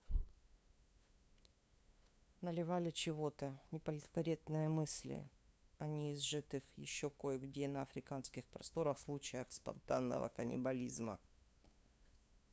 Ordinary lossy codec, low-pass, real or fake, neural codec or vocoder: none; none; fake; codec, 16 kHz, 2 kbps, FunCodec, trained on LibriTTS, 25 frames a second